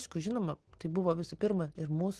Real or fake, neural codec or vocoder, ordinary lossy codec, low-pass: real; none; Opus, 16 kbps; 10.8 kHz